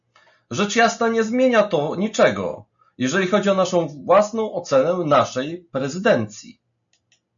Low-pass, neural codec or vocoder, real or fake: 7.2 kHz; none; real